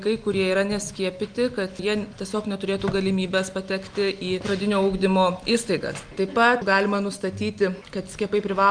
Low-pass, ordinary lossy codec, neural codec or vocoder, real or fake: 9.9 kHz; Opus, 32 kbps; none; real